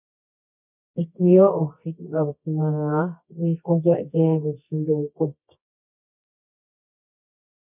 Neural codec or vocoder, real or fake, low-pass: codec, 24 kHz, 0.9 kbps, WavTokenizer, medium music audio release; fake; 3.6 kHz